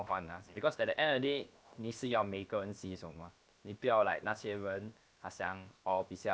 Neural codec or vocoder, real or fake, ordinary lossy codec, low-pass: codec, 16 kHz, about 1 kbps, DyCAST, with the encoder's durations; fake; none; none